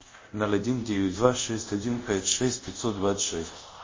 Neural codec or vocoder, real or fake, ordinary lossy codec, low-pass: codec, 24 kHz, 0.5 kbps, DualCodec; fake; MP3, 32 kbps; 7.2 kHz